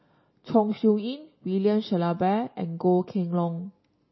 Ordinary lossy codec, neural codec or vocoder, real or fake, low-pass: MP3, 24 kbps; none; real; 7.2 kHz